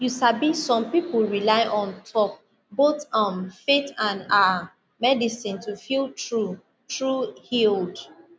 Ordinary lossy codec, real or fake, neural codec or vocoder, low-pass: none; real; none; none